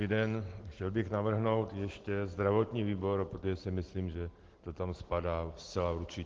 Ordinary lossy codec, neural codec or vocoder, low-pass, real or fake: Opus, 16 kbps; none; 7.2 kHz; real